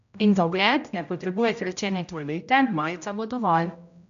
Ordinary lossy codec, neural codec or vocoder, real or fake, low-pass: none; codec, 16 kHz, 0.5 kbps, X-Codec, HuBERT features, trained on general audio; fake; 7.2 kHz